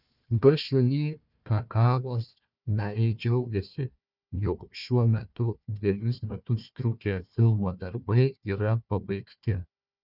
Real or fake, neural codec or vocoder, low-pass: fake; codec, 16 kHz, 1 kbps, FunCodec, trained on Chinese and English, 50 frames a second; 5.4 kHz